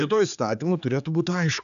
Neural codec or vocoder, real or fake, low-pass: codec, 16 kHz, 4 kbps, X-Codec, HuBERT features, trained on general audio; fake; 7.2 kHz